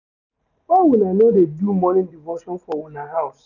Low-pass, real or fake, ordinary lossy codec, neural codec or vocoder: 7.2 kHz; real; none; none